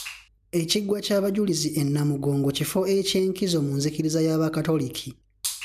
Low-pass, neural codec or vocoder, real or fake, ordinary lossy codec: 14.4 kHz; none; real; none